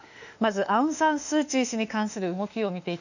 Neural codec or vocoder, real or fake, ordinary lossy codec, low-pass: autoencoder, 48 kHz, 32 numbers a frame, DAC-VAE, trained on Japanese speech; fake; none; 7.2 kHz